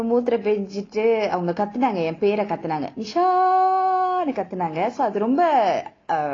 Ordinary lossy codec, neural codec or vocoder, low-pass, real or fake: AAC, 32 kbps; none; 7.2 kHz; real